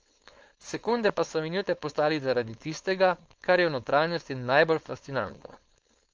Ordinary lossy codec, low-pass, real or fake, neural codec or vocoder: Opus, 24 kbps; 7.2 kHz; fake; codec, 16 kHz, 4.8 kbps, FACodec